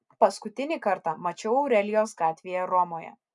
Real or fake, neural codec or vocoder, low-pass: real; none; 10.8 kHz